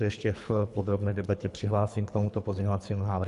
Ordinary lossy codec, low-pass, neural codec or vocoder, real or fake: AAC, 96 kbps; 10.8 kHz; codec, 24 kHz, 3 kbps, HILCodec; fake